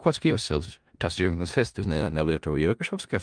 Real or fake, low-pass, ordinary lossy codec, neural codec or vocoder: fake; 9.9 kHz; Opus, 32 kbps; codec, 16 kHz in and 24 kHz out, 0.4 kbps, LongCat-Audio-Codec, four codebook decoder